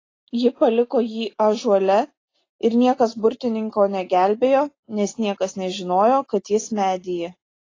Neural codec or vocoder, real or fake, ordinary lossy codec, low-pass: none; real; AAC, 32 kbps; 7.2 kHz